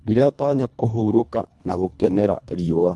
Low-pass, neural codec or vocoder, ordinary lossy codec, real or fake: none; codec, 24 kHz, 1.5 kbps, HILCodec; none; fake